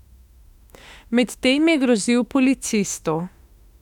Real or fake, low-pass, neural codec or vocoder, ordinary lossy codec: fake; 19.8 kHz; autoencoder, 48 kHz, 32 numbers a frame, DAC-VAE, trained on Japanese speech; none